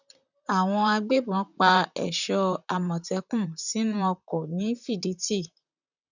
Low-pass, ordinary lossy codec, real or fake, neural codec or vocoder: 7.2 kHz; none; fake; vocoder, 44.1 kHz, 128 mel bands, Pupu-Vocoder